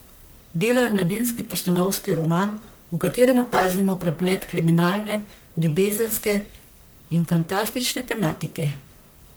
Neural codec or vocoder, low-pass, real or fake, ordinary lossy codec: codec, 44.1 kHz, 1.7 kbps, Pupu-Codec; none; fake; none